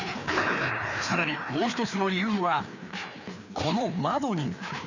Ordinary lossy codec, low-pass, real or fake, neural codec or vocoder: none; 7.2 kHz; fake; codec, 16 kHz, 2 kbps, FreqCodec, larger model